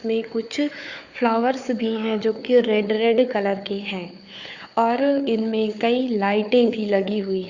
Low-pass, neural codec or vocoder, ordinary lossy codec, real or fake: 7.2 kHz; codec, 16 kHz, 4 kbps, FunCodec, trained on Chinese and English, 50 frames a second; Opus, 64 kbps; fake